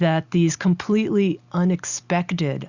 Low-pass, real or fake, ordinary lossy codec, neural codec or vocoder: 7.2 kHz; real; Opus, 64 kbps; none